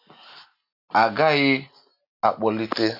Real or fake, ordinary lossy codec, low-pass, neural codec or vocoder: real; none; 5.4 kHz; none